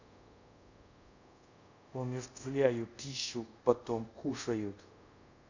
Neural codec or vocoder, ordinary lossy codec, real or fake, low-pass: codec, 24 kHz, 0.5 kbps, DualCodec; none; fake; 7.2 kHz